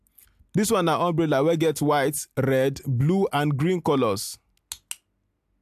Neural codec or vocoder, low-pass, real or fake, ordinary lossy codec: none; 14.4 kHz; real; AAC, 96 kbps